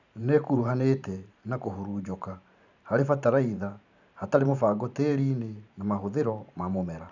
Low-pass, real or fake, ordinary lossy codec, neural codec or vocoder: 7.2 kHz; fake; none; vocoder, 44.1 kHz, 128 mel bands every 512 samples, BigVGAN v2